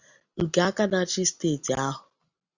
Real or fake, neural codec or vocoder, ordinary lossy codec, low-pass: real; none; Opus, 64 kbps; 7.2 kHz